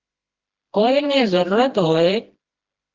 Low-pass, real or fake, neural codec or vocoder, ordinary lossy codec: 7.2 kHz; fake; codec, 16 kHz, 1 kbps, FreqCodec, smaller model; Opus, 16 kbps